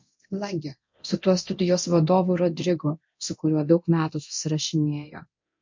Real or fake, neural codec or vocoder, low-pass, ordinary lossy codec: fake; codec, 24 kHz, 0.9 kbps, DualCodec; 7.2 kHz; MP3, 48 kbps